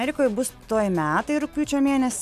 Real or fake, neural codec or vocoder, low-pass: real; none; 14.4 kHz